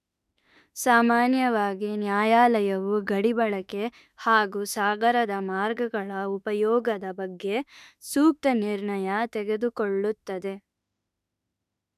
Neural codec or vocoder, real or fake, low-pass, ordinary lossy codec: autoencoder, 48 kHz, 32 numbers a frame, DAC-VAE, trained on Japanese speech; fake; 14.4 kHz; none